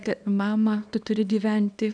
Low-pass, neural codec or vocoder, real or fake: 9.9 kHz; codec, 24 kHz, 0.9 kbps, WavTokenizer, small release; fake